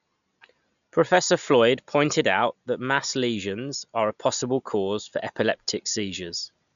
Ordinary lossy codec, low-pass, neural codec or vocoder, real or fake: none; 7.2 kHz; none; real